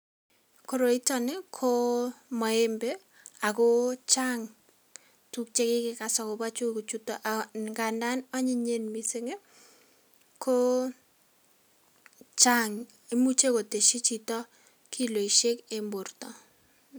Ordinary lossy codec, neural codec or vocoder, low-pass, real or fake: none; none; none; real